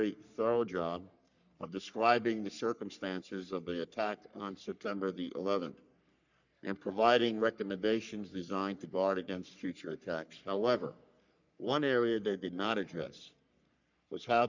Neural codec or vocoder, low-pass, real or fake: codec, 44.1 kHz, 3.4 kbps, Pupu-Codec; 7.2 kHz; fake